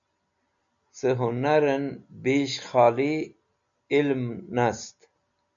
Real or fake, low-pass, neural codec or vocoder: real; 7.2 kHz; none